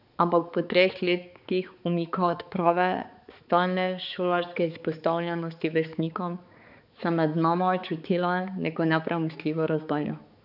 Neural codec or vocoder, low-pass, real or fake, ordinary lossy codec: codec, 16 kHz, 4 kbps, X-Codec, HuBERT features, trained on balanced general audio; 5.4 kHz; fake; none